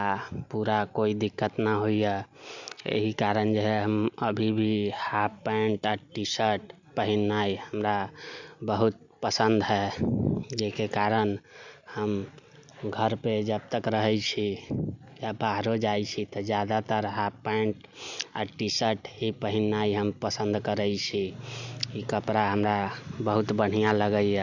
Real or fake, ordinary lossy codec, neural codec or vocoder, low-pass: real; Opus, 64 kbps; none; 7.2 kHz